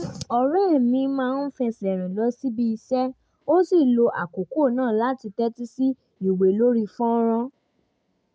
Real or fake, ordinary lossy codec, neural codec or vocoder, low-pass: real; none; none; none